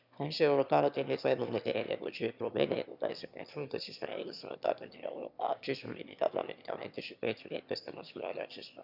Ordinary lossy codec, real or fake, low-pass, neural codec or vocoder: none; fake; 5.4 kHz; autoencoder, 22.05 kHz, a latent of 192 numbers a frame, VITS, trained on one speaker